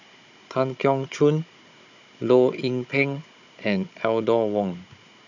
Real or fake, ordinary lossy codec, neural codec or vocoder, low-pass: fake; none; codec, 16 kHz, 16 kbps, FunCodec, trained on Chinese and English, 50 frames a second; 7.2 kHz